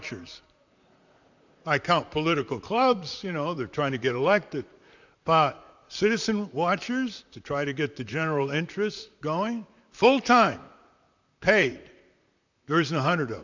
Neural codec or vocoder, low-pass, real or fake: vocoder, 44.1 kHz, 128 mel bands, Pupu-Vocoder; 7.2 kHz; fake